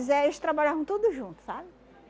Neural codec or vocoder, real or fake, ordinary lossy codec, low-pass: none; real; none; none